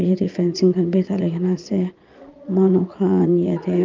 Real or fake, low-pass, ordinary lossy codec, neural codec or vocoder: fake; 7.2 kHz; Opus, 24 kbps; vocoder, 22.05 kHz, 80 mel bands, Vocos